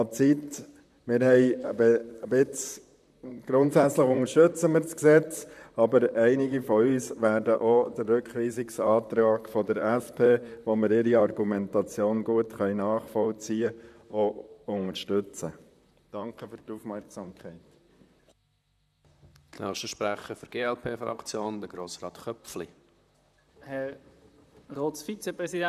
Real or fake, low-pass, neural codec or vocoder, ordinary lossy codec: fake; 14.4 kHz; vocoder, 44.1 kHz, 128 mel bands, Pupu-Vocoder; none